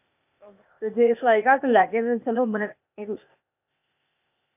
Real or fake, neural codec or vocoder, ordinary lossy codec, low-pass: fake; codec, 16 kHz, 0.8 kbps, ZipCodec; none; 3.6 kHz